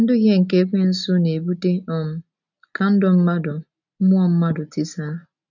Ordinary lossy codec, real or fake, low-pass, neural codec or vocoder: none; real; 7.2 kHz; none